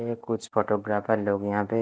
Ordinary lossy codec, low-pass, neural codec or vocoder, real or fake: none; none; none; real